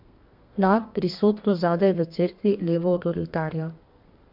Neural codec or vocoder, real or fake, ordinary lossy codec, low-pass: codec, 32 kHz, 1.9 kbps, SNAC; fake; MP3, 48 kbps; 5.4 kHz